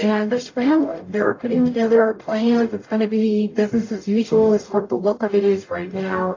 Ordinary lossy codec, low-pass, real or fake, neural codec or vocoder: AAC, 32 kbps; 7.2 kHz; fake; codec, 44.1 kHz, 0.9 kbps, DAC